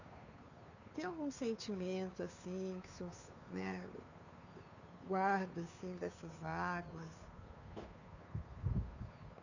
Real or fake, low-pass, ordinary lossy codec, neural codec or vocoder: fake; 7.2 kHz; none; codec, 16 kHz, 2 kbps, FunCodec, trained on Chinese and English, 25 frames a second